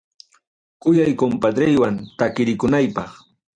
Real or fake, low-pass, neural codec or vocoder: fake; 9.9 kHz; vocoder, 44.1 kHz, 128 mel bands every 256 samples, BigVGAN v2